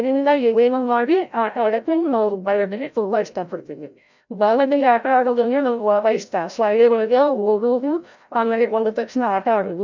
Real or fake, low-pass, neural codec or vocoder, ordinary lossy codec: fake; 7.2 kHz; codec, 16 kHz, 0.5 kbps, FreqCodec, larger model; none